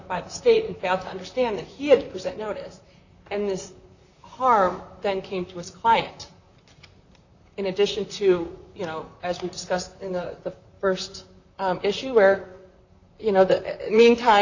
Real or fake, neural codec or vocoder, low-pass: fake; vocoder, 44.1 kHz, 128 mel bands, Pupu-Vocoder; 7.2 kHz